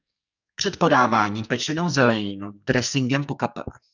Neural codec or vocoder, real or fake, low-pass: codec, 44.1 kHz, 2.6 kbps, SNAC; fake; 7.2 kHz